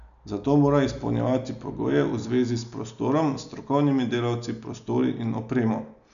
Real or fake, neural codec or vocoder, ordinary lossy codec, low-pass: real; none; none; 7.2 kHz